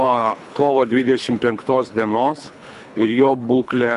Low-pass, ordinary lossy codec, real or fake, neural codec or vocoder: 10.8 kHz; AAC, 96 kbps; fake; codec, 24 kHz, 3 kbps, HILCodec